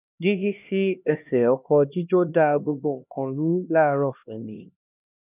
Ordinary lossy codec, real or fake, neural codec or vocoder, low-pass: none; fake; codec, 16 kHz, 1 kbps, X-Codec, HuBERT features, trained on LibriSpeech; 3.6 kHz